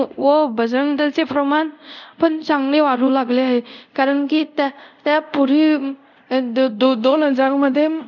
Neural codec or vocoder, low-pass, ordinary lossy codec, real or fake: codec, 24 kHz, 0.5 kbps, DualCodec; 7.2 kHz; none; fake